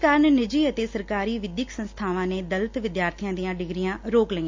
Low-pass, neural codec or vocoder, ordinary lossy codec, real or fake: 7.2 kHz; none; MP3, 48 kbps; real